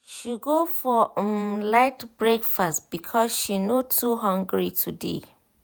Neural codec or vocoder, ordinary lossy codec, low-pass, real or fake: vocoder, 48 kHz, 128 mel bands, Vocos; none; none; fake